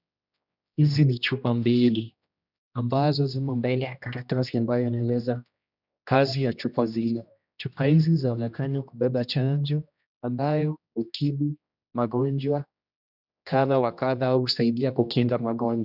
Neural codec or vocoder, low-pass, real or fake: codec, 16 kHz, 1 kbps, X-Codec, HuBERT features, trained on general audio; 5.4 kHz; fake